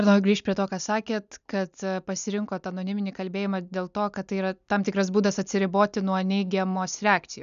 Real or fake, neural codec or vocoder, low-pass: real; none; 7.2 kHz